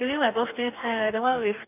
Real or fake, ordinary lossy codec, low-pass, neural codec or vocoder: fake; none; 3.6 kHz; codec, 44.1 kHz, 2.6 kbps, DAC